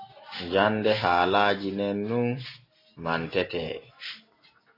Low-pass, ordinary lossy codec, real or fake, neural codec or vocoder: 5.4 kHz; AAC, 24 kbps; real; none